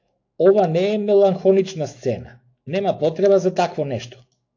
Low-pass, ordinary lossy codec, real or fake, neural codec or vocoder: 7.2 kHz; AAC, 48 kbps; fake; autoencoder, 48 kHz, 128 numbers a frame, DAC-VAE, trained on Japanese speech